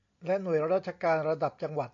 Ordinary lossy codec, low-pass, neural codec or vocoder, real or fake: AAC, 48 kbps; 7.2 kHz; none; real